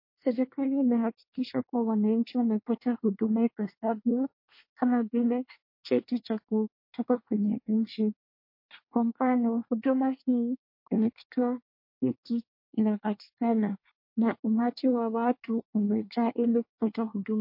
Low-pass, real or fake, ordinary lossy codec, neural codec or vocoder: 5.4 kHz; fake; MP3, 32 kbps; codec, 24 kHz, 1 kbps, SNAC